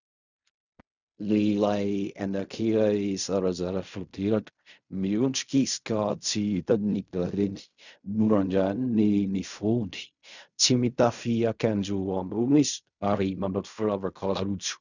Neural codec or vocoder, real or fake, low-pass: codec, 16 kHz in and 24 kHz out, 0.4 kbps, LongCat-Audio-Codec, fine tuned four codebook decoder; fake; 7.2 kHz